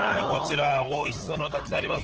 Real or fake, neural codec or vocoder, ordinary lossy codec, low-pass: fake; codec, 16 kHz, 8 kbps, FunCodec, trained on LibriTTS, 25 frames a second; Opus, 16 kbps; 7.2 kHz